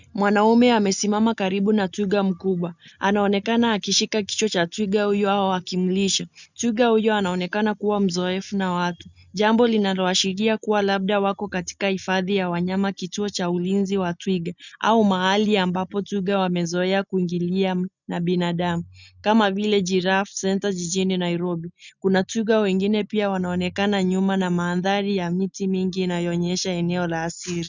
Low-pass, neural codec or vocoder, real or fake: 7.2 kHz; none; real